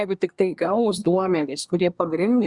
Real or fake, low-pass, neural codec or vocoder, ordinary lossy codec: fake; 10.8 kHz; codec, 24 kHz, 1 kbps, SNAC; Opus, 64 kbps